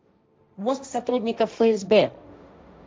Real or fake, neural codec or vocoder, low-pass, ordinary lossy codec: fake; codec, 16 kHz, 1.1 kbps, Voila-Tokenizer; none; none